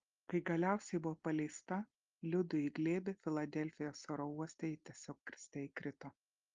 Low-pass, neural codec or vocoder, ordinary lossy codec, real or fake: 7.2 kHz; none; Opus, 32 kbps; real